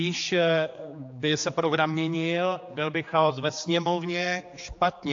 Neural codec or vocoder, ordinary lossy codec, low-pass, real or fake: codec, 16 kHz, 4 kbps, X-Codec, HuBERT features, trained on general audio; AAC, 48 kbps; 7.2 kHz; fake